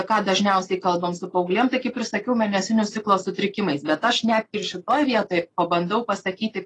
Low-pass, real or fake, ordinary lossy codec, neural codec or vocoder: 10.8 kHz; real; AAC, 32 kbps; none